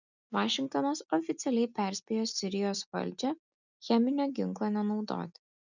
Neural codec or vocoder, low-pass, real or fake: none; 7.2 kHz; real